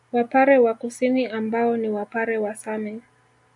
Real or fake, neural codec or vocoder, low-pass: real; none; 10.8 kHz